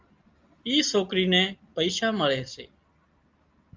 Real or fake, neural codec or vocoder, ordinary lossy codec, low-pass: real; none; Opus, 32 kbps; 7.2 kHz